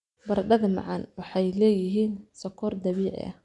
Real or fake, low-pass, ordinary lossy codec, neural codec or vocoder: real; 10.8 kHz; none; none